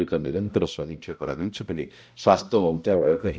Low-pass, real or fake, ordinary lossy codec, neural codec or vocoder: none; fake; none; codec, 16 kHz, 0.5 kbps, X-Codec, HuBERT features, trained on balanced general audio